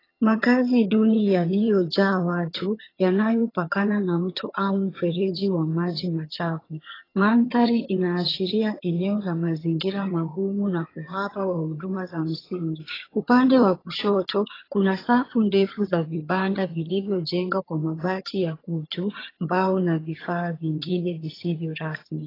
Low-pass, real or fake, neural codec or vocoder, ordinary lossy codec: 5.4 kHz; fake; vocoder, 22.05 kHz, 80 mel bands, HiFi-GAN; AAC, 24 kbps